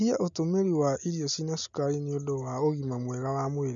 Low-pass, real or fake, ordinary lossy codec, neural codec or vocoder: 7.2 kHz; real; none; none